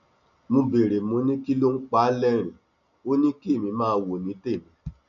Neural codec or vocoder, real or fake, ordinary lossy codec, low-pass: none; real; none; 7.2 kHz